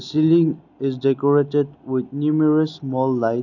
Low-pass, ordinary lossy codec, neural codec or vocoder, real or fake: 7.2 kHz; none; none; real